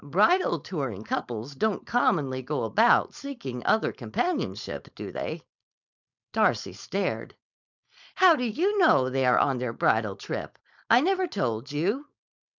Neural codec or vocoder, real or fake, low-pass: codec, 16 kHz, 4.8 kbps, FACodec; fake; 7.2 kHz